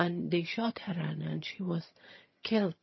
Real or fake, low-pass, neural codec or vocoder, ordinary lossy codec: fake; 7.2 kHz; vocoder, 22.05 kHz, 80 mel bands, HiFi-GAN; MP3, 24 kbps